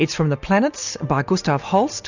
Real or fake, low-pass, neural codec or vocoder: real; 7.2 kHz; none